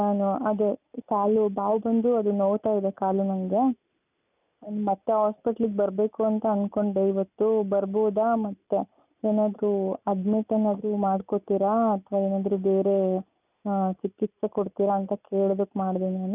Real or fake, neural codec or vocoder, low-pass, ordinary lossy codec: real; none; 3.6 kHz; none